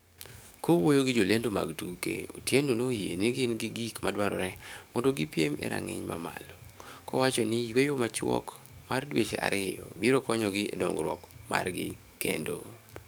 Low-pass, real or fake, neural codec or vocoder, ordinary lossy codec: none; fake; codec, 44.1 kHz, 7.8 kbps, DAC; none